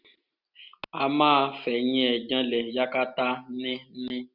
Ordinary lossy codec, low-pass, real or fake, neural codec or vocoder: none; 5.4 kHz; real; none